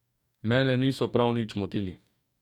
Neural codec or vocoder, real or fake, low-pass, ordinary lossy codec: codec, 44.1 kHz, 2.6 kbps, DAC; fake; 19.8 kHz; none